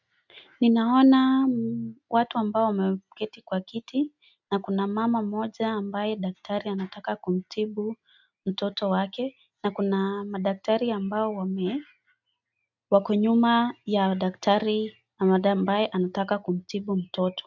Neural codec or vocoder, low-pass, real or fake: none; 7.2 kHz; real